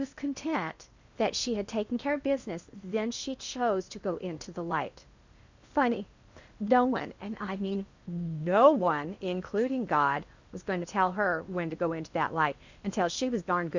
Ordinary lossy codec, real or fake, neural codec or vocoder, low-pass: Opus, 64 kbps; fake; codec, 16 kHz in and 24 kHz out, 0.8 kbps, FocalCodec, streaming, 65536 codes; 7.2 kHz